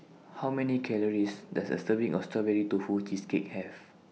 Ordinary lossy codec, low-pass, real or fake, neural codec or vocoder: none; none; real; none